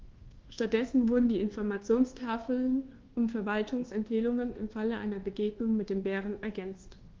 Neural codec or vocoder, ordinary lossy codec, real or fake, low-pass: codec, 24 kHz, 1.2 kbps, DualCodec; Opus, 16 kbps; fake; 7.2 kHz